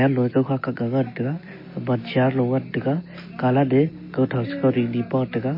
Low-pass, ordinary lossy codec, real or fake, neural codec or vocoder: 5.4 kHz; MP3, 24 kbps; real; none